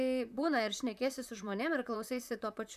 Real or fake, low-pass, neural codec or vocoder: fake; 14.4 kHz; vocoder, 44.1 kHz, 128 mel bands, Pupu-Vocoder